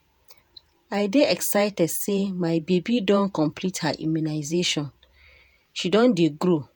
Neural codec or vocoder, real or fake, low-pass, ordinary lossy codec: vocoder, 48 kHz, 128 mel bands, Vocos; fake; none; none